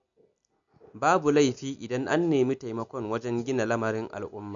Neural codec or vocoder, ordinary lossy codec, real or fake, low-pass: none; none; real; 7.2 kHz